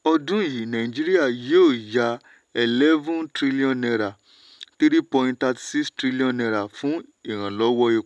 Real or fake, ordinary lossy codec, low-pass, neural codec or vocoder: real; none; none; none